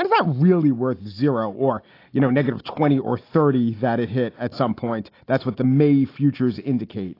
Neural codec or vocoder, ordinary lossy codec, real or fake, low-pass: none; AAC, 32 kbps; real; 5.4 kHz